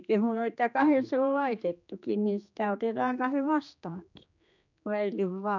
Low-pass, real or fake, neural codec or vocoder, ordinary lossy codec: 7.2 kHz; fake; codec, 16 kHz, 2 kbps, X-Codec, HuBERT features, trained on general audio; none